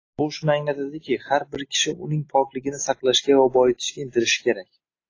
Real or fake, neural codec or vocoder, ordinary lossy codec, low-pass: real; none; AAC, 32 kbps; 7.2 kHz